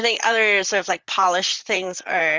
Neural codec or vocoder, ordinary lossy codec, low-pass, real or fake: vocoder, 44.1 kHz, 128 mel bands, Pupu-Vocoder; Opus, 32 kbps; 7.2 kHz; fake